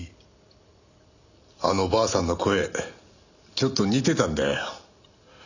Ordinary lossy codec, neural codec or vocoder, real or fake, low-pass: none; none; real; 7.2 kHz